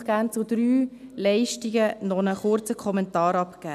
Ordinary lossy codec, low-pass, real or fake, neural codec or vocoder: none; 14.4 kHz; real; none